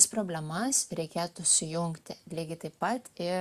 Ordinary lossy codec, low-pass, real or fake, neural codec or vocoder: Opus, 64 kbps; 14.4 kHz; real; none